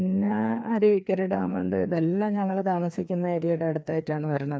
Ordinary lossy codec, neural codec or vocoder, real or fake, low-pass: none; codec, 16 kHz, 2 kbps, FreqCodec, larger model; fake; none